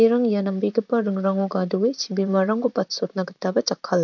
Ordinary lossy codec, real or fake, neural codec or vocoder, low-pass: none; fake; vocoder, 44.1 kHz, 128 mel bands, Pupu-Vocoder; 7.2 kHz